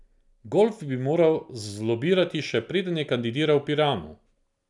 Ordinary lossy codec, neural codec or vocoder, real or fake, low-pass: none; none; real; 10.8 kHz